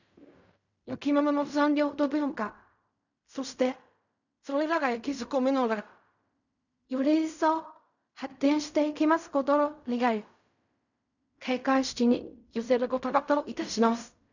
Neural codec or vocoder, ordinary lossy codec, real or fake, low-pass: codec, 16 kHz in and 24 kHz out, 0.4 kbps, LongCat-Audio-Codec, fine tuned four codebook decoder; none; fake; 7.2 kHz